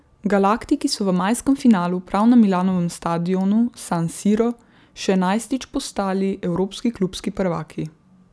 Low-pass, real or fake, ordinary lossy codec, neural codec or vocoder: none; real; none; none